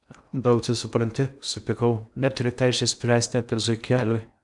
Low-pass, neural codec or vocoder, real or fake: 10.8 kHz; codec, 16 kHz in and 24 kHz out, 0.8 kbps, FocalCodec, streaming, 65536 codes; fake